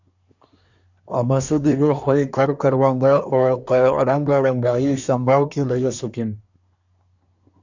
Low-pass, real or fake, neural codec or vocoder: 7.2 kHz; fake; codec, 24 kHz, 1 kbps, SNAC